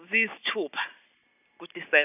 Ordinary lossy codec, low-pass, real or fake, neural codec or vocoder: none; 3.6 kHz; real; none